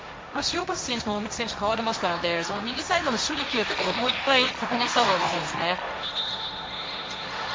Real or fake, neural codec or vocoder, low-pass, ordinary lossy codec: fake; codec, 16 kHz, 1.1 kbps, Voila-Tokenizer; none; none